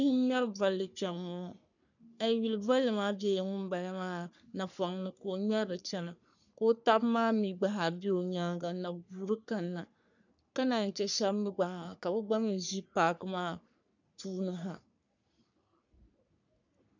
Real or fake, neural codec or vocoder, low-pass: fake; codec, 44.1 kHz, 3.4 kbps, Pupu-Codec; 7.2 kHz